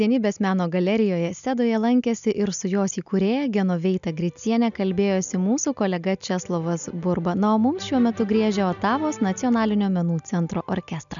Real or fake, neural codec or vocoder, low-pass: real; none; 7.2 kHz